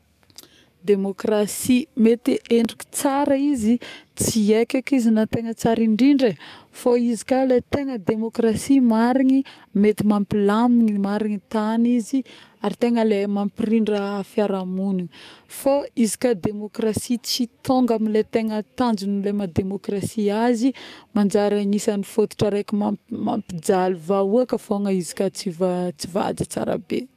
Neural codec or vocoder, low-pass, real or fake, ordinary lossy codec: codec, 44.1 kHz, 7.8 kbps, DAC; 14.4 kHz; fake; none